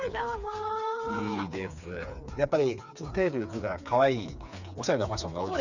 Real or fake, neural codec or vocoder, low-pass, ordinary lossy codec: fake; codec, 16 kHz, 4 kbps, FreqCodec, smaller model; 7.2 kHz; none